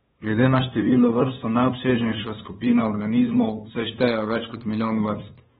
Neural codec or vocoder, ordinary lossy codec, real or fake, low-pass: codec, 16 kHz, 2 kbps, FunCodec, trained on LibriTTS, 25 frames a second; AAC, 16 kbps; fake; 7.2 kHz